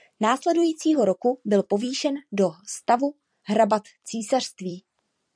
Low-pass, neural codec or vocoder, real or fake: 9.9 kHz; vocoder, 44.1 kHz, 128 mel bands every 256 samples, BigVGAN v2; fake